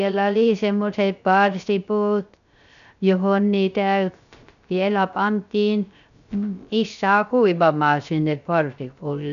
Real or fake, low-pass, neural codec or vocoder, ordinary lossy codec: fake; 7.2 kHz; codec, 16 kHz, 0.3 kbps, FocalCodec; none